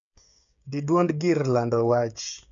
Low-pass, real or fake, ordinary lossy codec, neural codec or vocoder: 7.2 kHz; fake; none; codec, 16 kHz, 8 kbps, FreqCodec, smaller model